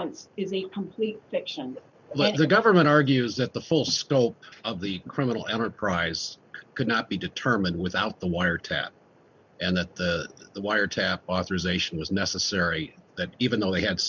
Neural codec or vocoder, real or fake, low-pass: none; real; 7.2 kHz